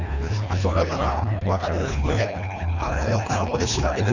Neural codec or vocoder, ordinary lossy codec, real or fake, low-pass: codec, 24 kHz, 1.5 kbps, HILCodec; none; fake; 7.2 kHz